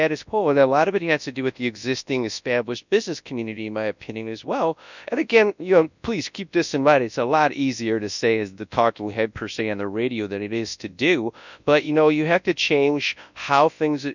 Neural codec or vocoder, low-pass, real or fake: codec, 24 kHz, 0.9 kbps, WavTokenizer, large speech release; 7.2 kHz; fake